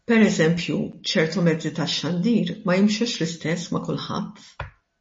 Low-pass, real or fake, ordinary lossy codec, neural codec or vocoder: 9.9 kHz; real; MP3, 32 kbps; none